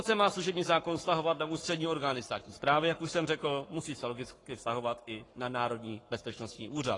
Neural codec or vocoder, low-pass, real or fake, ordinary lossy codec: codec, 44.1 kHz, 7.8 kbps, Pupu-Codec; 10.8 kHz; fake; AAC, 32 kbps